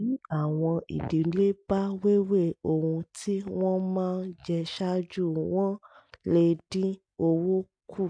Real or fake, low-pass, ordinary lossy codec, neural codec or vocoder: real; 9.9 kHz; MP3, 64 kbps; none